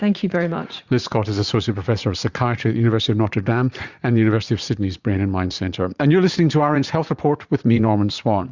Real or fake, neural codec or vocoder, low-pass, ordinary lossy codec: fake; vocoder, 44.1 kHz, 80 mel bands, Vocos; 7.2 kHz; Opus, 64 kbps